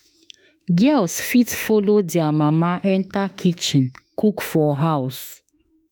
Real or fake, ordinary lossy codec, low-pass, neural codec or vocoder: fake; none; none; autoencoder, 48 kHz, 32 numbers a frame, DAC-VAE, trained on Japanese speech